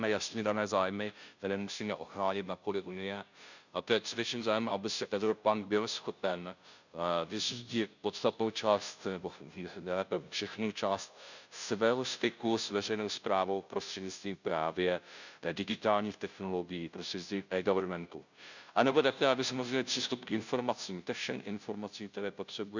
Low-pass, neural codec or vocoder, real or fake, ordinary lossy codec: 7.2 kHz; codec, 16 kHz, 0.5 kbps, FunCodec, trained on Chinese and English, 25 frames a second; fake; none